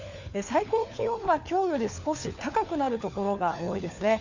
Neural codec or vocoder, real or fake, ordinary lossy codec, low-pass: codec, 16 kHz, 4 kbps, FunCodec, trained on LibriTTS, 50 frames a second; fake; none; 7.2 kHz